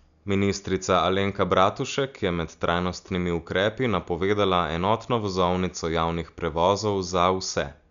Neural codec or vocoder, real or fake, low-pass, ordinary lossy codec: none; real; 7.2 kHz; none